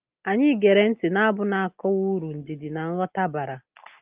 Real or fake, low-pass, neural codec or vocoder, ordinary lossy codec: real; 3.6 kHz; none; Opus, 32 kbps